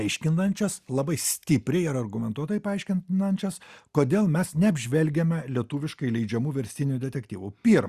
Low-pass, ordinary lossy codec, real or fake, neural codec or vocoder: 14.4 kHz; Opus, 64 kbps; real; none